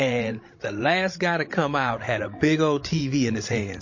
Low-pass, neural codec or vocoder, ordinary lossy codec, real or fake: 7.2 kHz; codec, 16 kHz, 16 kbps, FreqCodec, larger model; MP3, 32 kbps; fake